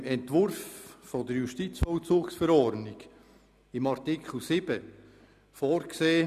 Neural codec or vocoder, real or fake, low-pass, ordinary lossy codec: none; real; 14.4 kHz; none